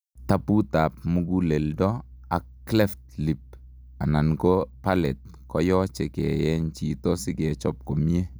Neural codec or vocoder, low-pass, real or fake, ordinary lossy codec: none; none; real; none